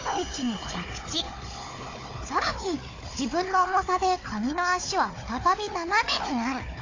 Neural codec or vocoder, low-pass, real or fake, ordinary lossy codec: codec, 16 kHz, 4 kbps, FunCodec, trained on Chinese and English, 50 frames a second; 7.2 kHz; fake; AAC, 32 kbps